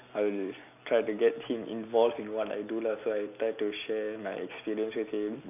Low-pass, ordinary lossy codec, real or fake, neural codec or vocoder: 3.6 kHz; none; real; none